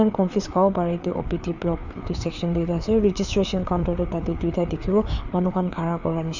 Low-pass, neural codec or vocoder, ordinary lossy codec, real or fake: 7.2 kHz; codec, 16 kHz, 4 kbps, FreqCodec, larger model; none; fake